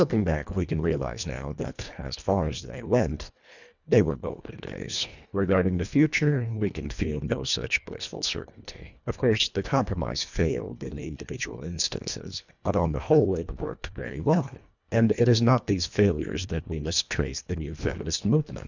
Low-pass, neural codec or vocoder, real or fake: 7.2 kHz; codec, 24 kHz, 1.5 kbps, HILCodec; fake